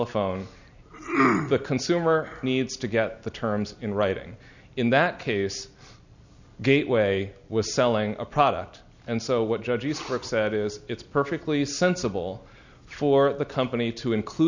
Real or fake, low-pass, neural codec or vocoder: real; 7.2 kHz; none